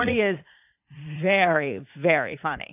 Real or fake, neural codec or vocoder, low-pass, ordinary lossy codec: fake; vocoder, 44.1 kHz, 80 mel bands, Vocos; 3.6 kHz; AAC, 32 kbps